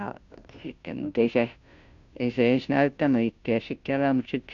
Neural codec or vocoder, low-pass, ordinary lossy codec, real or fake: codec, 16 kHz, 0.5 kbps, FunCodec, trained on Chinese and English, 25 frames a second; 7.2 kHz; MP3, 96 kbps; fake